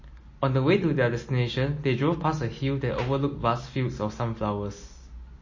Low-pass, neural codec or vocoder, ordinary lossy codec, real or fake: 7.2 kHz; none; MP3, 32 kbps; real